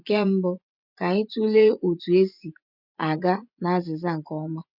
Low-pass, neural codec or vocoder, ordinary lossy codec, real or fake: 5.4 kHz; vocoder, 24 kHz, 100 mel bands, Vocos; none; fake